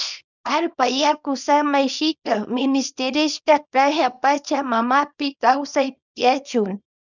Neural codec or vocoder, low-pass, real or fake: codec, 24 kHz, 0.9 kbps, WavTokenizer, small release; 7.2 kHz; fake